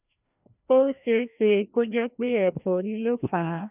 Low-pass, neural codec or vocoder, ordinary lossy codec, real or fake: 3.6 kHz; codec, 16 kHz, 1 kbps, FreqCodec, larger model; none; fake